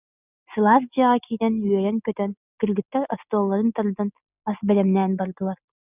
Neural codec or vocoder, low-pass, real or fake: none; 3.6 kHz; real